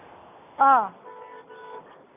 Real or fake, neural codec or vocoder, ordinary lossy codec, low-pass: real; none; none; 3.6 kHz